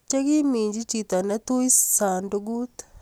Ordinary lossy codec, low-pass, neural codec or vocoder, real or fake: none; none; none; real